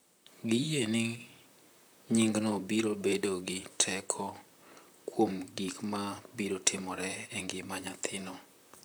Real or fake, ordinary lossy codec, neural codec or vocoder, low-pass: fake; none; vocoder, 44.1 kHz, 128 mel bands, Pupu-Vocoder; none